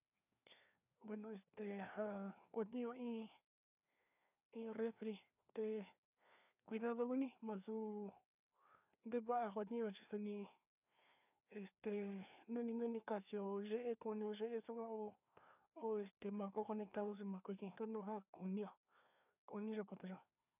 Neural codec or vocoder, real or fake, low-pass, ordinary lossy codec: codec, 16 kHz, 2 kbps, FreqCodec, larger model; fake; 3.6 kHz; none